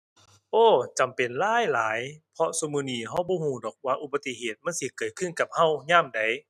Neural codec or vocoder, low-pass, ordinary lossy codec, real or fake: none; 14.4 kHz; none; real